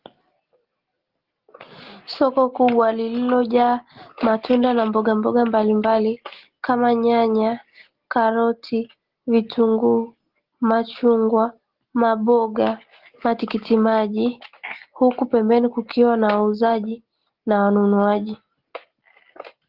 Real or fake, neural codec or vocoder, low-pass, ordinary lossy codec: real; none; 5.4 kHz; Opus, 16 kbps